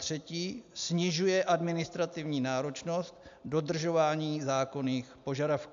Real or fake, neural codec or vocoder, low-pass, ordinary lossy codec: real; none; 7.2 kHz; AAC, 64 kbps